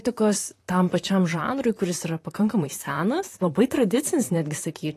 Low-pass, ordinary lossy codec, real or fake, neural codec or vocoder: 14.4 kHz; AAC, 48 kbps; fake; vocoder, 44.1 kHz, 128 mel bands, Pupu-Vocoder